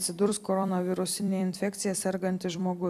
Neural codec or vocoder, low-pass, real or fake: vocoder, 44.1 kHz, 128 mel bands every 256 samples, BigVGAN v2; 14.4 kHz; fake